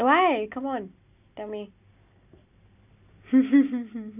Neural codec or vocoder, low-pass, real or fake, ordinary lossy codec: none; 3.6 kHz; real; none